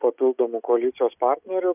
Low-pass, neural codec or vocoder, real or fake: 3.6 kHz; none; real